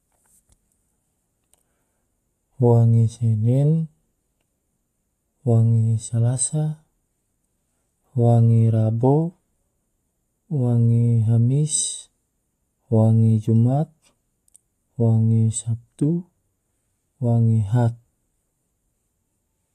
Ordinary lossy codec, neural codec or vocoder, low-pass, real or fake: AAC, 48 kbps; none; 14.4 kHz; real